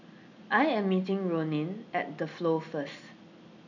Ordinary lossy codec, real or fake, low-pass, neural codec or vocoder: none; real; 7.2 kHz; none